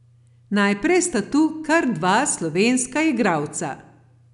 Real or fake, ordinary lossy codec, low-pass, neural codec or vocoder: real; none; 10.8 kHz; none